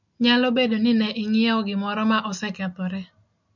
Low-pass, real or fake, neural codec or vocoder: 7.2 kHz; real; none